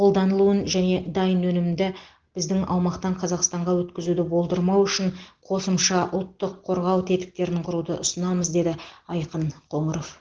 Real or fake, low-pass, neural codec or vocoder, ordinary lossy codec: real; 7.2 kHz; none; Opus, 16 kbps